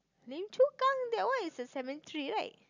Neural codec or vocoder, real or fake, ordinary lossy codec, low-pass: none; real; none; 7.2 kHz